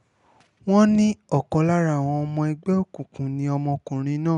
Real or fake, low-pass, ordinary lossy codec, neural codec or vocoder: real; 10.8 kHz; none; none